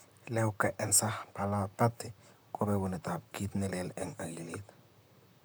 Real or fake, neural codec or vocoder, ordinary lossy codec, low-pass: fake; vocoder, 44.1 kHz, 128 mel bands, Pupu-Vocoder; none; none